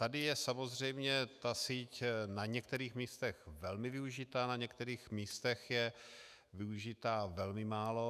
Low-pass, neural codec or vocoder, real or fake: 14.4 kHz; autoencoder, 48 kHz, 128 numbers a frame, DAC-VAE, trained on Japanese speech; fake